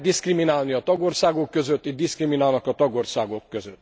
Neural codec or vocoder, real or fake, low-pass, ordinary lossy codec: none; real; none; none